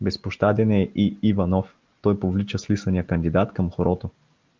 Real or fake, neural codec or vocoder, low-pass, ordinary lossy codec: real; none; 7.2 kHz; Opus, 32 kbps